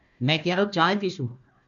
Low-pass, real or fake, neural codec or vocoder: 7.2 kHz; fake; codec, 16 kHz, 1 kbps, FunCodec, trained on Chinese and English, 50 frames a second